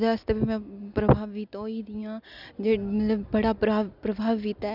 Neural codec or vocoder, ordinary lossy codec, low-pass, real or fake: none; none; 5.4 kHz; real